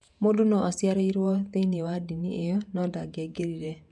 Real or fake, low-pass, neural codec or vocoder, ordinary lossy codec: fake; 10.8 kHz; vocoder, 48 kHz, 128 mel bands, Vocos; none